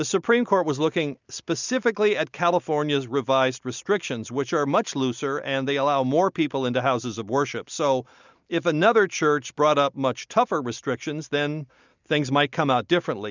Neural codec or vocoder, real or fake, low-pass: none; real; 7.2 kHz